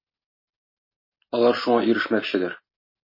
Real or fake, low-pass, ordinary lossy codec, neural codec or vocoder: real; 5.4 kHz; MP3, 24 kbps; none